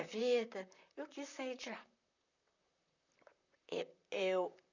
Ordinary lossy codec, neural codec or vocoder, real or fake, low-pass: none; vocoder, 44.1 kHz, 128 mel bands, Pupu-Vocoder; fake; 7.2 kHz